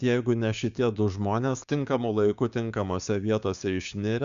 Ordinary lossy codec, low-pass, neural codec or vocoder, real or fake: Opus, 64 kbps; 7.2 kHz; codec, 16 kHz, 6 kbps, DAC; fake